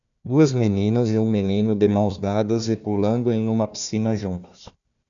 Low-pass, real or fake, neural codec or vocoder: 7.2 kHz; fake; codec, 16 kHz, 1 kbps, FunCodec, trained on Chinese and English, 50 frames a second